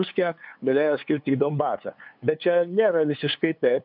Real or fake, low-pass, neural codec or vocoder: fake; 5.4 kHz; codec, 16 kHz, 2 kbps, FunCodec, trained on LibriTTS, 25 frames a second